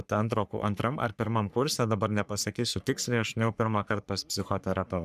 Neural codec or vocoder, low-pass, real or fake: codec, 44.1 kHz, 3.4 kbps, Pupu-Codec; 14.4 kHz; fake